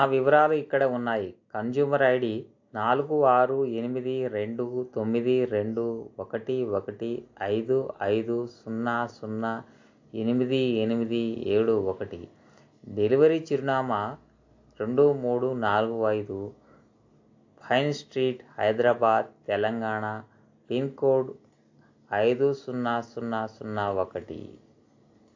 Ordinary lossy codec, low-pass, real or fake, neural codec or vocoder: AAC, 48 kbps; 7.2 kHz; real; none